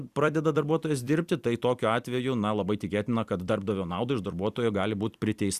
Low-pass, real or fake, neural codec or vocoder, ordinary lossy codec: 14.4 kHz; fake; vocoder, 44.1 kHz, 128 mel bands every 256 samples, BigVGAN v2; Opus, 64 kbps